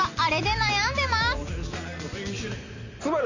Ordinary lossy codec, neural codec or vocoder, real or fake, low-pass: Opus, 64 kbps; none; real; 7.2 kHz